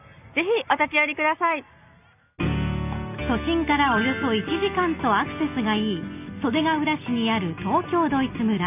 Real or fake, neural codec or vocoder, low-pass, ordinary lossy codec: real; none; 3.6 kHz; none